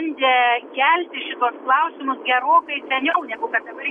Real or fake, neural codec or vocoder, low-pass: real; none; 9.9 kHz